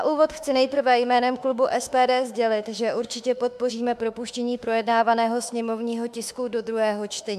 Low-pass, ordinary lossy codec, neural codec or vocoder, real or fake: 14.4 kHz; AAC, 96 kbps; autoencoder, 48 kHz, 32 numbers a frame, DAC-VAE, trained on Japanese speech; fake